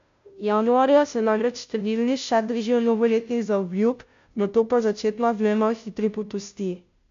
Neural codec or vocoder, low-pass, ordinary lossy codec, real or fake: codec, 16 kHz, 0.5 kbps, FunCodec, trained on Chinese and English, 25 frames a second; 7.2 kHz; MP3, 64 kbps; fake